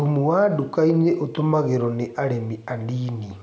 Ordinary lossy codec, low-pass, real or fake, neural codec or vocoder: none; none; real; none